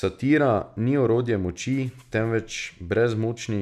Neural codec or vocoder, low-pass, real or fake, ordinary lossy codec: none; 14.4 kHz; real; none